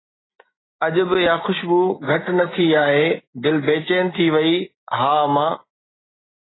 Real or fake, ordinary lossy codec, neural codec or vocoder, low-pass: real; AAC, 16 kbps; none; 7.2 kHz